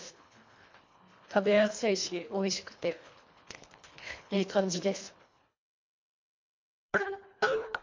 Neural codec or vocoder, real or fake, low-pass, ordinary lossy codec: codec, 24 kHz, 1.5 kbps, HILCodec; fake; 7.2 kHz; MP3, 48 kbps